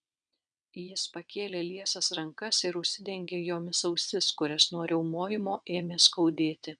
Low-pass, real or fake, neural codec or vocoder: 9.9 kHz; fake; vocoder, 22.05 kHz, 80 mel bands, WaveNeXt